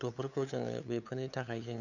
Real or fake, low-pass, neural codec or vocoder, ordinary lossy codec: fake; 7.2 kHz; codec, 16 kHz, 8 kbps, FunCodec, trained on LibriTTS, 25 frames a second; none